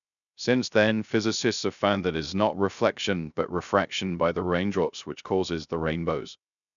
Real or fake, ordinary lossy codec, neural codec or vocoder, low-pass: fake; none; codec, 16 kHz, 0.3 kbps, FocalCodec; 7.2 kHz